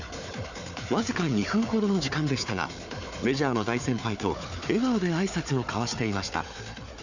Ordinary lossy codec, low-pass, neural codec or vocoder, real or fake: none; 7.2 kHz; codec, 16 kHz, 4 kbps, FunCodec, trained on Chinese and English, 50 frames a second; fake